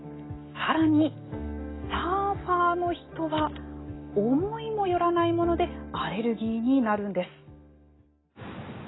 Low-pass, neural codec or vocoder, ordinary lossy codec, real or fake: 7.2 kHz; none; AAC, 16 kbps; real